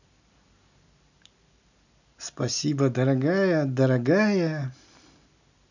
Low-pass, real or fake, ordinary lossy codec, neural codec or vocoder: 7.2 kHz; real; none; none